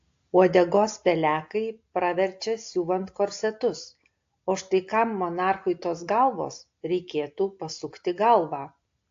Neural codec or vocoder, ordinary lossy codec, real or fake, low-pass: none; AAC, 48 kbps; real; 7.2 kHz